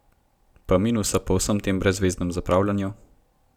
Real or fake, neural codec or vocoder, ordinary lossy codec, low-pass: real; none; none; 19.8 kHz